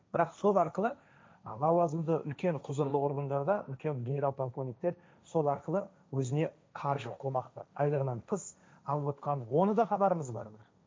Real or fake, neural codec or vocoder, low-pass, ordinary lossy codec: fake; codec, 16 kHz, 1.1 kbps, Voila-Tokenizer; none; none